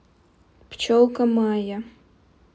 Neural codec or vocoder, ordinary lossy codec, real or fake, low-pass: none; none; real; none